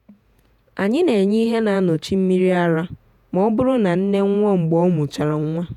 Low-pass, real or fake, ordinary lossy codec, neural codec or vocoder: 19.8 kHz; fake; none; vocoder, 48 kHz, 128 mel bands, Vocos